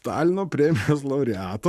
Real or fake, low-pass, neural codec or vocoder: fake; 14.4 kHz; codec, 44.1 kHz, 7.8 kbps, Pupu-Codec